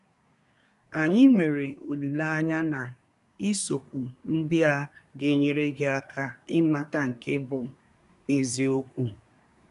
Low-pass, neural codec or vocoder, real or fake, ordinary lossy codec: 10.8 kHz; codec, 24 kHz, 1 kbps, SNAC; fake; none